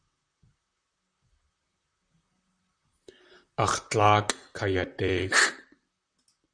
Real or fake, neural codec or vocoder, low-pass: fake; vocoder, 44.1 kHz, 128 mel bands, Pupu-Vocoder; 9.9 kHz